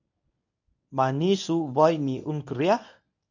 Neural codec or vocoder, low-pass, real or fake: codec, 24 kHz, 0.9 kbps, WavTokenizer, medium speech release version 1; 7.2 kHz; fake